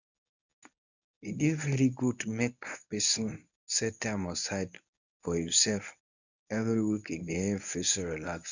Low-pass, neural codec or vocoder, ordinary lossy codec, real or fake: 7.2 kHz; codec, 24 kHz, 0.9 kbps, WavTokenizer, medium speech release version 1; none; fake